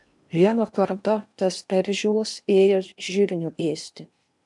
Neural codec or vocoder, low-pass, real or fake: codec, 16 kHz in and 24 kHz out, 0.8 kbps, FocalCodec, streaming, 65536 codes; 10.8 kHz; fake